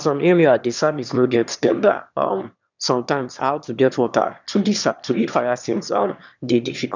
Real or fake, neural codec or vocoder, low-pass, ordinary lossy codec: fake; autoencoder, 22.05 kHz, a latent of 192 numbers a frame, VITS, trained on one speaker; 7.2 kHz; none